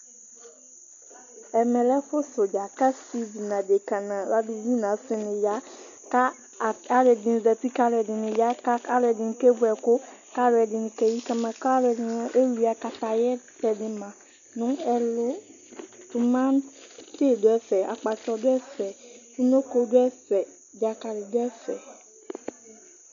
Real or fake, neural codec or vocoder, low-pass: real; none; 7.2 kHz